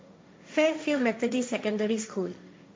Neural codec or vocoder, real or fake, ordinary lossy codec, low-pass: codec, 16 kHz, 1.1 kbps, Voila-Tokenizer; fake; none; none